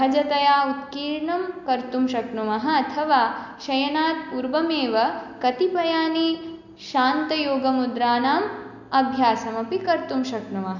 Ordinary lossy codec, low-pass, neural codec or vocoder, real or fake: none; 7.2 kHz; none; real